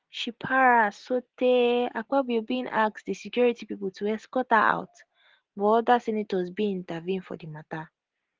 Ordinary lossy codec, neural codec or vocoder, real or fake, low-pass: Opus, 16 kbps; none; real; 7.2 kHz